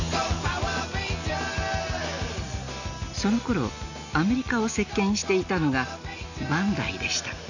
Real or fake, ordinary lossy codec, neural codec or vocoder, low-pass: real; none; none; 7.2 kHz